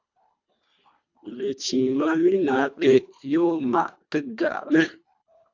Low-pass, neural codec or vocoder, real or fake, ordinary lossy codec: 7.2 kHz; codec, 24 kHz, 1.5 kbps, HILCodec; fake; MP3, 64 kbps